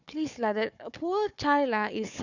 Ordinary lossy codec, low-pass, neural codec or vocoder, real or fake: none; 7.2 kHz; codec, 16 kHz, 4 kbps, FunCodec, trained on Chinese and English, 50 frames a second; fake